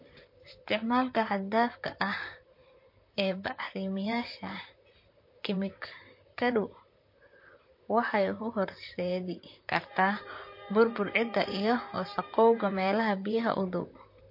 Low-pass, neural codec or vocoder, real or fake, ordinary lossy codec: 5.4 kHz; vocoder, 44.1 kHz, 128 mel bands, Pupu-Vocoder; fake; MP3, 32 kbps